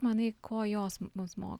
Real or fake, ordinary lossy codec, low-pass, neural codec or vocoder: real; Opus, 64 kbps; 19.8 kHz; none